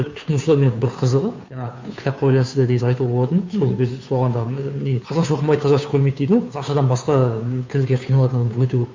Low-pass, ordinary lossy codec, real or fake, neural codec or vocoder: 7.2 kHz; MP3, 48 kbps; fake; codec, 16 kHz, 2 kbps, FunCodec, trained on Chinese and English, 25 frames a second